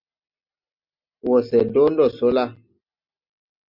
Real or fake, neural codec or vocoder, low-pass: real; none; 5.4 kHz